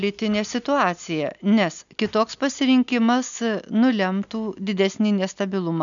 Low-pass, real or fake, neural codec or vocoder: 7.2 kHz; real; none